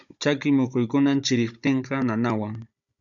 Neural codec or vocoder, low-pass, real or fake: codec, 16 kHz, 16 kbps, FunCodec, trained on Chinese and English, 50 frames a second; 7.2 kHz; fake